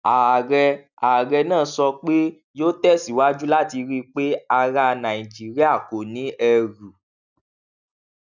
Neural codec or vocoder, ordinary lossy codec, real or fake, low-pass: none; none; real; 7.2 kHz